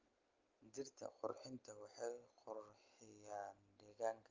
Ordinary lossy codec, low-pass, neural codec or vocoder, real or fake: Opus, 24 kbps; 7.2 kHz; none; real